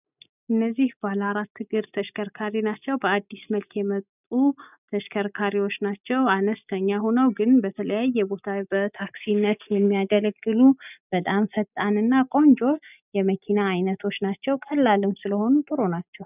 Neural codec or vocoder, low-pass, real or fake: none; 3.6 kHz; real